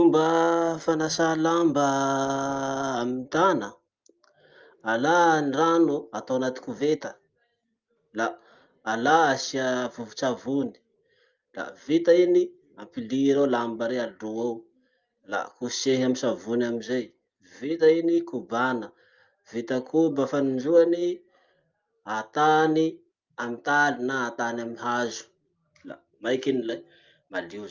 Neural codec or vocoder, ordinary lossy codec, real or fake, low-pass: none; Opus, 24 kbps; real; 7.2 kHz